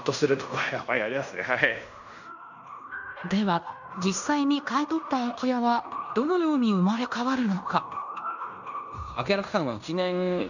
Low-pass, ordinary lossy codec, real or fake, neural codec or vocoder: 7.2 kHz; none; fake; codec, 16 kHz in and 24 kHz out, 0.9 kbps, LongCat-Audio-Codec, fine tuned four codebook decoder